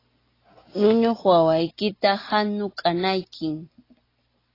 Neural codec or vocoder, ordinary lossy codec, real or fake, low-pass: none; AAC, 24 kbps; real; 5.4 kHz